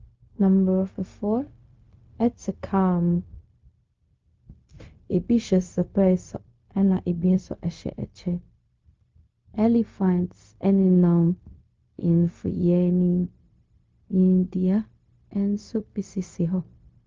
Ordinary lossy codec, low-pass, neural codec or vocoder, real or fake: Opus, 24 kbps; 7.2 kHz; codec, 16 kHz, 0.4 kbps, LongCat-Audio-Codec; fake